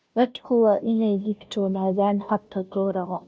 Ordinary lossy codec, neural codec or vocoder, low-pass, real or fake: none; codec, 16 kHz, 0.5 kbps, FunCodec, trained on Chinese and English, 25 frames a second; none; fake